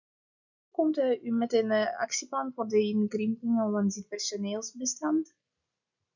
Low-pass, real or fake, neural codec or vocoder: 7.2 kHz; real; none